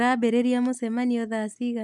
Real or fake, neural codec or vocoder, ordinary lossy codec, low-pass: real; none; none; none